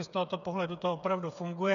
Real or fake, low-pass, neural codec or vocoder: fake; 7.2 kHz; codec, 16 kHz, 8 kbps, FreqCodec, smaller model